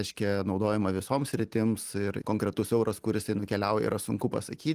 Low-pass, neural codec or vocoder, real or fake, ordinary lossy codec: 14.4 kHz; none; real; Opus, 24 kbps